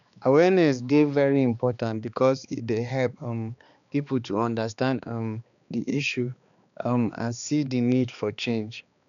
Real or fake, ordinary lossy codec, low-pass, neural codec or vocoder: fake; none; 7.2 kHz; codec, 16 kHz, 2 kbps, X-Codec, HuBERT features, trained on balanced general audio